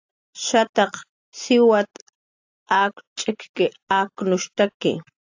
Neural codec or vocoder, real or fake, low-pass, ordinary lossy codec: none; real; 7.2 kHz; AAC, 48 kbps